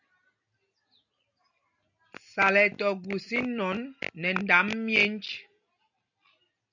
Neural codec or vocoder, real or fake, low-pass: none; real; 7.2 kHz